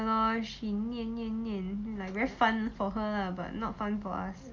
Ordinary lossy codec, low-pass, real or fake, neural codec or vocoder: Opus, 32 kbps; 7.2 kHz; real; none